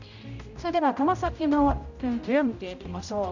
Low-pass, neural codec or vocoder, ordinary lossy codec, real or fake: 7.2 kHz; codec, 16 kHz, 0.5 kbps, X-Codec, HuBERT features, trained on general audio; none; fake